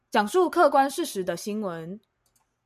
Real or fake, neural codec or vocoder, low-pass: real; none; 14.4 kHz